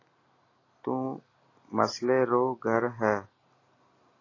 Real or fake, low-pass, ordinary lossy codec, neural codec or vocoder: real; 7.2 kHz; AAC, 32 kbps; none